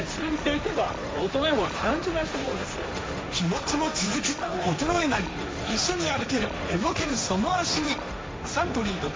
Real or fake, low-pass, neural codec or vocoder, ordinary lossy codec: fake; none; codec, 16 kHz, 1.1 kbps, Voila-Tokenizer; none